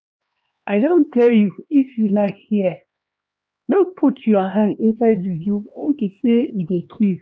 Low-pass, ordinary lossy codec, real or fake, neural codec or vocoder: none; none; fake; codec, 16 kHz, 2 kbps, X-Codec, HuBERT features, trained on LibriSpeech